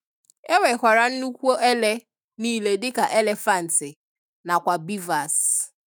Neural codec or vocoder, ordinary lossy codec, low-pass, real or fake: autoencoder, 48 kHz, 128 numbers a frame, DAC-VAE, trained on Japanese speech; none; none; fake